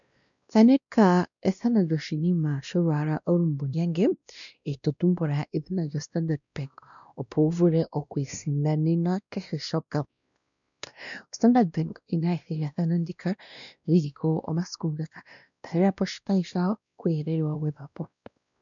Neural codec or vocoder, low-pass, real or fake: codec, 16 kHz, 1 kbps, X-Codec, WavLM features, trained on Multilingual LibriSpeech; 7.2 kHz; fake